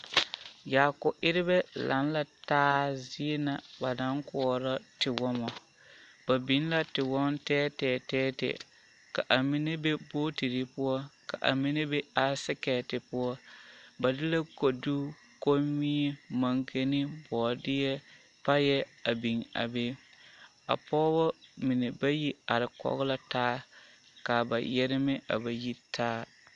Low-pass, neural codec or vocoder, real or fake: 10.8 kHz; none; real